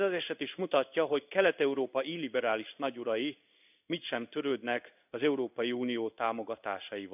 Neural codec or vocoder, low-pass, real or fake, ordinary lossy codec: codec, 16 kHz in and 24 kHz out, 1 kbps, XY-Tokenizer; 3.6 kHz; fake; none